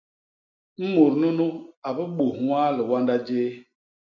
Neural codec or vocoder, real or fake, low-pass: none; real; 7.2 kHz